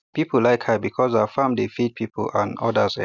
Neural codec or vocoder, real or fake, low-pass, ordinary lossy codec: none; real; 7.2 kHz; none